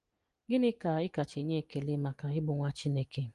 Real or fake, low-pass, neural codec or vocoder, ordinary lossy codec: real; 10.8 kHz; none; Opus, 24 kbps